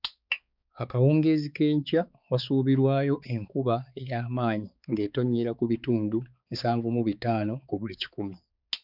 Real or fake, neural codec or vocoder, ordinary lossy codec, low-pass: fake; codec, 16 kHz, 4 kbps, X-Codec, HuBERT features, trained on balanced general audio; none; 5.4 kHz